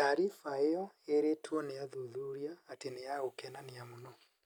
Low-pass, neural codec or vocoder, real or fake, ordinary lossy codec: none; none; real; none